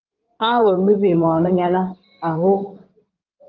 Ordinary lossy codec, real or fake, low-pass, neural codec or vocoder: Opus, 32 kbps; fake; 7.2 kHz; codec, 16 kHz in and 24 kHz out, 2.2 kbps, FireRedTTS-2 codec